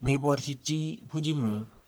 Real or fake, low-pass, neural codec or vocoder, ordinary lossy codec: fake; none; codec, 44.1 kHz, 1.7 kbps, Pupu-Codec; none